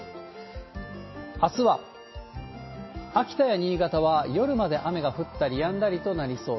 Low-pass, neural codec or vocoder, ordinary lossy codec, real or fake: 7.2 kHz; none; MP3, 24 kbps; real